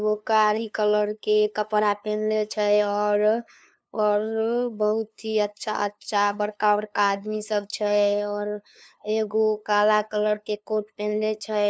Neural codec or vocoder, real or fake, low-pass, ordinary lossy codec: codec, 16 kHz, 2 kbps, FunCodec, trained on LibriTTS, 25 frames a second; fake; none; none